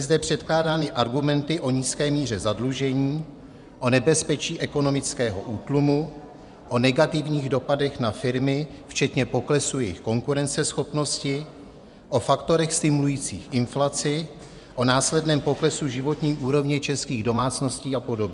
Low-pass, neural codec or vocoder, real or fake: 10.8 kHz; vocoder, 24 kHz, 100 mel bands, Vocos; fake